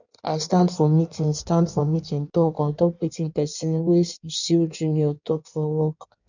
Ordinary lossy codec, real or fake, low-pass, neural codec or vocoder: none; fake; 7.2 kHz; codec, 16 kHz in and 24 kHz out, 1.1 kbps, FireRedTTS-2 codec